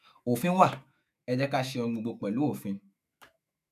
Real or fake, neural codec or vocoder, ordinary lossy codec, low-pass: fake; autoencoder, 48 kHz, 128 numbers a frame, DAC-VAE, trained on Japanese speech; none; 14.4 kHz